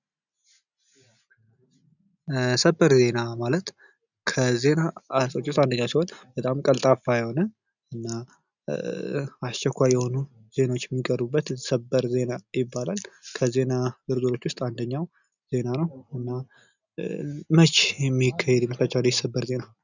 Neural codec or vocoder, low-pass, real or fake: none; 7.2 kHz; real